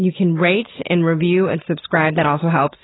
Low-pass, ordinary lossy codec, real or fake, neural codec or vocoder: 7.2 kHz; AAC, 16 kbps; real; none